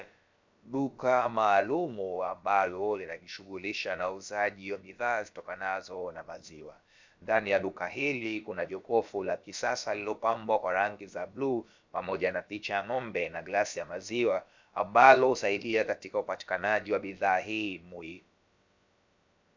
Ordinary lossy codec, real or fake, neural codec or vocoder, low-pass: MP3, 64 kbps; fake; codec, 16 kHz, about 1 kbps, DyCAST, with the encoder's durations; 7.2 kHz